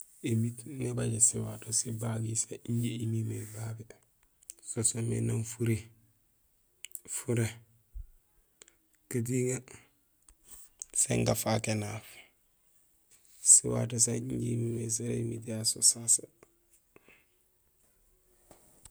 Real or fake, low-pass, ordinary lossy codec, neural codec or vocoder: real; none; none; none